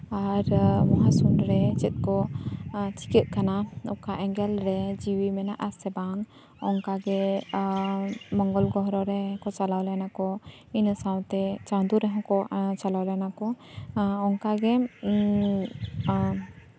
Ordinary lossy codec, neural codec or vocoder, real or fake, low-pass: none; none; real; none